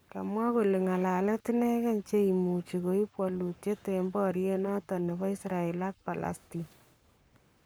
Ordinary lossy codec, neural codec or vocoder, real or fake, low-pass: none; codec, 44.1 kHz, 7.8 kbps, Pupu-Codec; fake; none